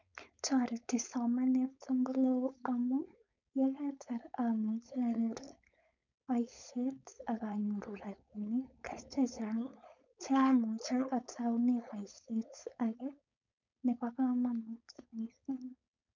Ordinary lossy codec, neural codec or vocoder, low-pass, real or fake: none; codec, 16 kHz, 4.8 kbps, FACodec; 7.2 kHz; fake